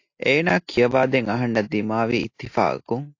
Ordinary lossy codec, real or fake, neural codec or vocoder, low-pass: AAC, 48 kbps; real; none; 7.2 kHz